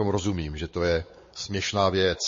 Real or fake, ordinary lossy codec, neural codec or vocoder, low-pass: fake; MP3, 32 kbps; codec, 16 kHz, 4 kbps, X-Codec, WavLM features, trained on Multilingual LibriSpeech; 7.2 kHz